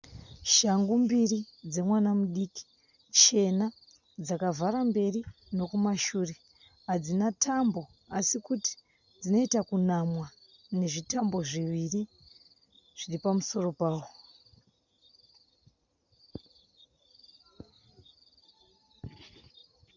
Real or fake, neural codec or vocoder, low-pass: real; none; 7.2 kHz